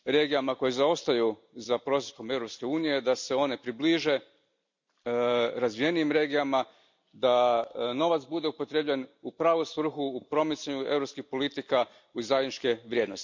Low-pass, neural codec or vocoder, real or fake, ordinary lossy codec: 7.2 kHz; none; real; MP3, 64 kbps